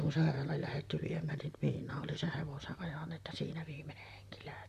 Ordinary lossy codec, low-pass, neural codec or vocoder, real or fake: none; 14.4 kHz; vocoder, 44.1 kHz, 128 mel bands, Pupu-Vocoder; fake